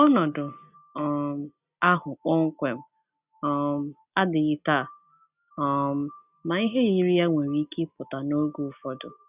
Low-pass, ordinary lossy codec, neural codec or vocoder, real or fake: 3.6 kHz; none; none; real